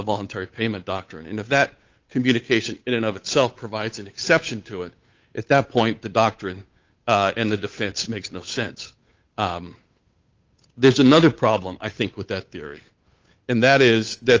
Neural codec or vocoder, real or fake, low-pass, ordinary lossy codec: codec, 16 kHz, 8 kbps, FunCodec, trained on Chinese and English, 25 frames a second; fake; 7.2 kHz; Opus, 24 kbps